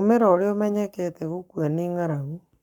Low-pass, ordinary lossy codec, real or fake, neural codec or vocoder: 19.8 kHz; none; fake; codec, 44.1 kHz, 7.8 kbps, Pupu-Codec